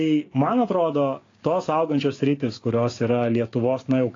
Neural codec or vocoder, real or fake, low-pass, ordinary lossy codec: codec, 16 kHz, 6 kbps, DAC; fake; 7.2 kHz; AAC, 32 kbps